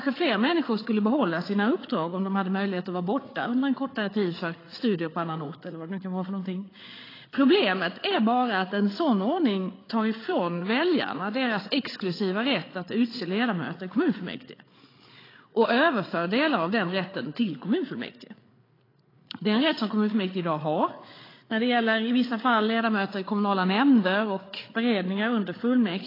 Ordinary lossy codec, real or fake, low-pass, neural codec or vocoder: AAC, 24 kbps; fake; 5.4 kHz; codec, 16 kHz, 8 kbps, FreqCodec, larger model